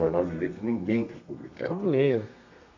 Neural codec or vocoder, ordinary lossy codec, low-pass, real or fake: codec, 32 kHz, 1.9 kbps, SNAC; MP3, 48 kbps; 7.2 kHz; fake